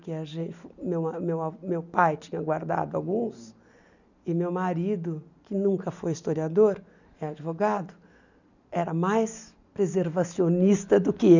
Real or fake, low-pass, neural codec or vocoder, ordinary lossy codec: real; 7.2 kHz; none; none